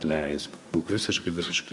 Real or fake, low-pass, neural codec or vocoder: fake; 10.8 kHz; codec, 24 kHz, 1 kbps, SNAC